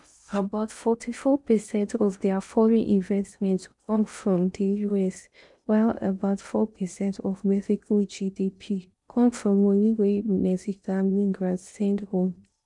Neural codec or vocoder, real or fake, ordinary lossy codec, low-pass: codec, 16 kHz in and 24 kHz out, 0.6 kbps, FocalCodec, streaming, 4096 codes; fake; none; 10.8 kHz